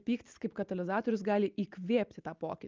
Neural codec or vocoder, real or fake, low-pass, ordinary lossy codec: none; real; 7.2 kHz; Opus, 32 kbps